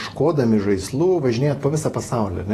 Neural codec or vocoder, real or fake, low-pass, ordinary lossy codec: vocoder, 48 kHz, 128 mel bands, Vocos; fake; 14.4 kHz; AAC, 48 kbps